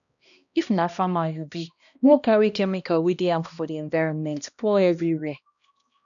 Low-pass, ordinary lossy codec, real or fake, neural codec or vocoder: 7.2 kHz; none; fake; codec, 16 kHz, 1 kbps, X-Codec, HuBERT features, trained on balanced general audio